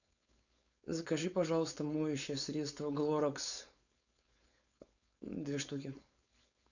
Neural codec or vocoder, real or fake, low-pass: codec, 16 kHz, 4.8 kbps, FACodec; fake; 7.2 kHz